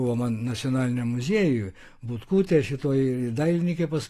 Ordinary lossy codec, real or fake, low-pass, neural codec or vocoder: AAC, 48 kbps; real; 14.4 kHz; none